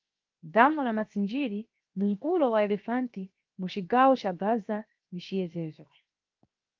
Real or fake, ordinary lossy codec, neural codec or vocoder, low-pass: fake; Opus, 32 kbps; codec, 16 kHz, 0.7 kbps, FocalCodec; 7.2 kHz